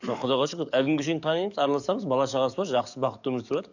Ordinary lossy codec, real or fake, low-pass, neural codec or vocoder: none; fake; 7.2 kHz; codec, 44.1 kHz, 7.8 kbps, DAC